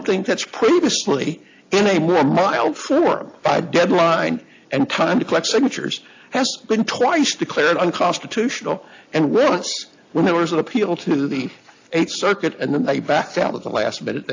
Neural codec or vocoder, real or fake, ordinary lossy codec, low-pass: none; real; AAC, 48 kbps; 7.2 kHz